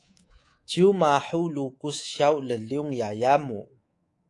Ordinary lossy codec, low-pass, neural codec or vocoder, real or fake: AAC, 48 kbps; 10.8 kHz; codec, 24 kHz, 3.1 kbps, DualCodec; fake